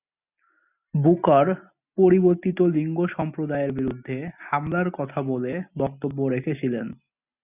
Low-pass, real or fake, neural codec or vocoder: 3.6 kHz; real; none